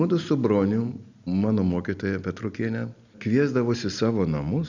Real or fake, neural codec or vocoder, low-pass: real; none; 7.2 kHz